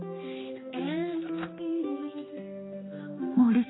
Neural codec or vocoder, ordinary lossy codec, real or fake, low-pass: codec, 16 kHz, 2 kbps, X-Codec, HuBERT features, trained on general audio; AAC, 16 kbps; fake; 7.2 kHz